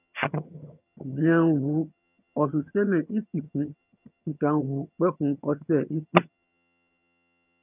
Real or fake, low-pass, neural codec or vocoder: fake; 3.6 kHz; vocoder, 22.05 kHz, 80 mel bands, HiFi-GAN